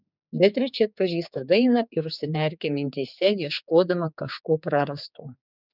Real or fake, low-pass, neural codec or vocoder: fake; 5.4 kHz; codec, 16 kHz, 4 kbps, X-Codec, HuBERT features, trained on general audio